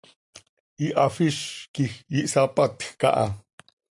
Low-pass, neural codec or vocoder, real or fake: 10.8 kHz; none; real